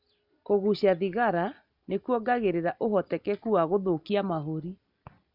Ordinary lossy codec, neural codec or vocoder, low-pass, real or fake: none; none; 5.4 kHz; real